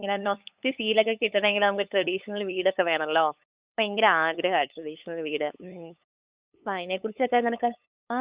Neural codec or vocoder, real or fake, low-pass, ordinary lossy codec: codec, 16 kHz, 8 kbps, FunCodec, trained on LibriTTS, 25 frames a second; fake; 3.6 kHz; Opus, 64 kbps